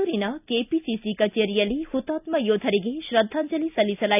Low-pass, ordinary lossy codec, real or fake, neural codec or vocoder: 3.6 kHz; none; real; none